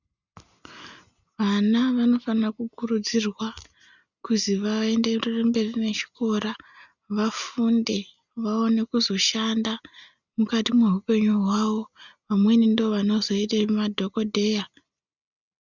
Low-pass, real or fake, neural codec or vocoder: 7.2 kHz; real; none